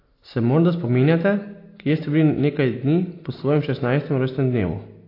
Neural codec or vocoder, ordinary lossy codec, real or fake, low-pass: none; AAC, 32 kbps; real; 5.4 kHz